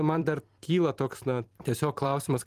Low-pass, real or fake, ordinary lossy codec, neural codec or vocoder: 14.4 kHz; fake; Opus, 24 kbps; vocoder, 44.1 kHz, 128 mel bands every 256 samples, BigVGAN v2